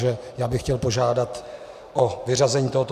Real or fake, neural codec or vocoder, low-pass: real; none; 14.4 kHz